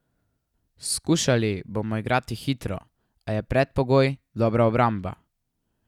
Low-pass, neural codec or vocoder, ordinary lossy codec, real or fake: 19.8 kHz; none; none; real